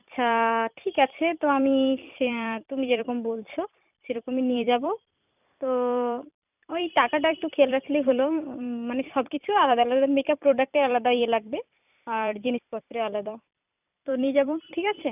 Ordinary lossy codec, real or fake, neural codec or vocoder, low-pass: none; fake; vocoder, 44.1 kHz, 128 mel bands every 256 samples, BigVGAN v2; 3.6 kHz